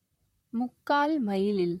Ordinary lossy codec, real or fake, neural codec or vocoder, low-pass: MP3, 64 kbps; fake; vocoder, 44.1 kHz, 128 mel bands, Pupu-Vocoder; 19.8 kHz